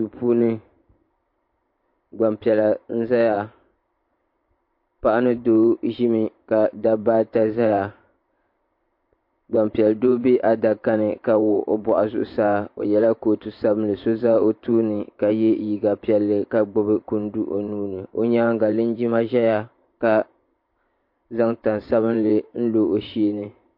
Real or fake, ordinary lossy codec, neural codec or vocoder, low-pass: fake; MP3, 32 kbps; vocoder, 44.1 kHz, 128 mel bands every 512 samples, BigVGAN v2; 5.4 kHz